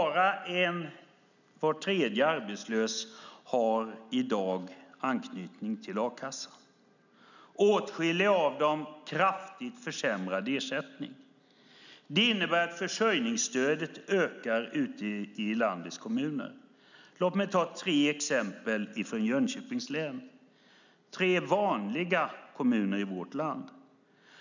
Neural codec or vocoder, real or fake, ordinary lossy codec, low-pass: none; real; none; 7.2 kHz